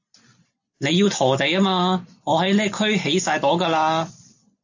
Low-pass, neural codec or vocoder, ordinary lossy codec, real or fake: 7.2 kHz; none; AAC, 48 kbps; real